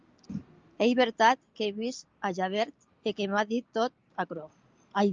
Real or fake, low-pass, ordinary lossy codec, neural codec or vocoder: real; 7.2 kHz; Opus, 24 kbps; none